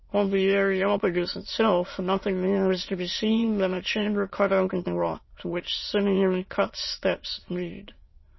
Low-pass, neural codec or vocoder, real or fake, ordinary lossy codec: 7.2 kHz; autoencoder, 22.05 kHz, a latent of 192 numbers a frame, VITS, trained on many speakers; fake; MP3, 24 kbps